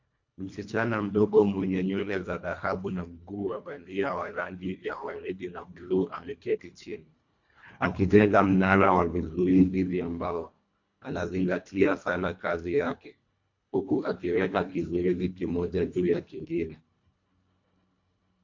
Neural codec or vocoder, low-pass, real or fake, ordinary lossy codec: codec, 24 kHz, 1.5 kbps, HILCodec; 7.2 kHz; fake; MP3, 48 kbps